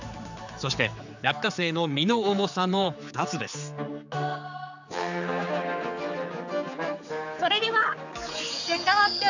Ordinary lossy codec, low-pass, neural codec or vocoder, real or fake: none; 7.2 kHz; codec, 16 kHz, 4 kbps, X-Codec, HuBERT features, trained on general audio; fake